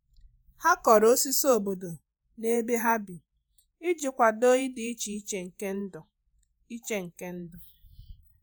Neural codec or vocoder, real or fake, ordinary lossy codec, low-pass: vocoder, 48 kHz, 128 mel bands, Vocos; fake; none; none